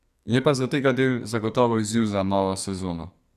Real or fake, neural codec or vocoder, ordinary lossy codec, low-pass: fake; codec, 44.1 kHz, 2.6 kbps, SNAC; none; 14.4 kHz